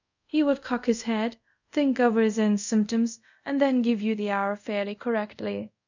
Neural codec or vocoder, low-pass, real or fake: codec, 24 kHz, 0.5 kbps, DualCodec; 7.2 kHz; fake